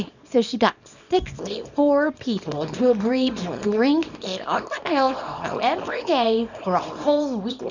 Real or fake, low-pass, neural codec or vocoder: fake; 7.2 kHz; codec, 24 kHz, 0.9 kbps, WavTokenizer, small release